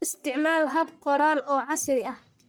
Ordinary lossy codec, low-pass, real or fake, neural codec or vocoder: none; none; fake; codec, 44.1 kHz, 1.7 kbps, Pupu-Codec